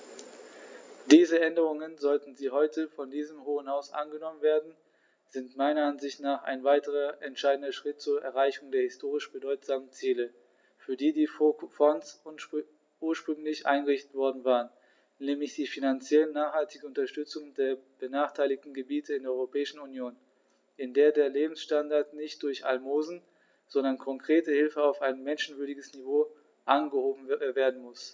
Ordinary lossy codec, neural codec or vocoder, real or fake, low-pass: none; none; real; none